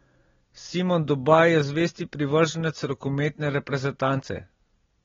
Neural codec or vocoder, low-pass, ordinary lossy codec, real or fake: none; 7.2 kHz; AAC, 24 kbps; real